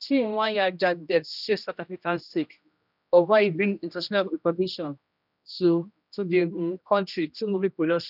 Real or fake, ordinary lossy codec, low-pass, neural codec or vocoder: fake; none; 5.4 kHz; codec, 16 kHz, 1 kbps, X-Codec, HuBERT features, trained on general audio